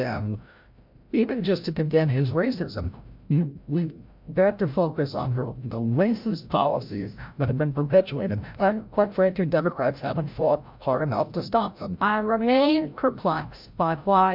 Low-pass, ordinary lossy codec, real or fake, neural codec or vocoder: 5.4 kHz; MP3, 48 kbps; fake; codec, 16 kHz, 0.5 kbps, FreqCodec, larger model